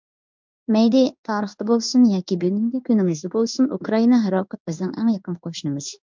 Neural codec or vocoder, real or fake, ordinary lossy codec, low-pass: codec, 16 kHz in and 24 kHz out, 1 kbps, XY-Tokenizer; fake; MP3, 64 kbps; 7.2 kHz